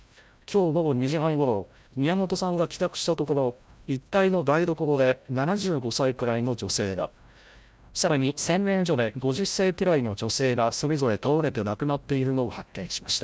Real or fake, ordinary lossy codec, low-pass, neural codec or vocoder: fake; none; none; codec, 16 kHz, 0.5 kbps, FreqCodec, larger model